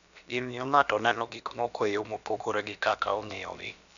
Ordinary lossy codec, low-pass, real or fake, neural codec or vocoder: none; 7.2 kHz; fake; codec, 16 kHz, about 1 kbps, DyCAST, with the encoder's durations